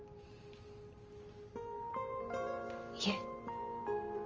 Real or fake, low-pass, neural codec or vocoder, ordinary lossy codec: real; 7.2 kHz; none; Opus, 24 kbps